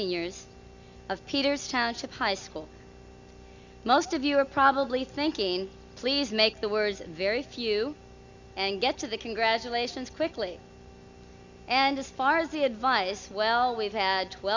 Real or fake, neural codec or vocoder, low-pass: real; none; 7.2 kHz